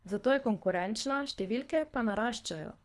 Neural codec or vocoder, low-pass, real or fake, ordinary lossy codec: codec, 24 kHz, 3 kbps, HILCodec; none; fake; none